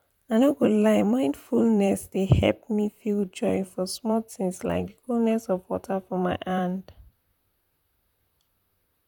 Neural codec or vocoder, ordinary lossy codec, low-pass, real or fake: vocoder, 44.1 kHz, 128 mel bands, Pupu-Vocoder; none; 19.8 kHz; fake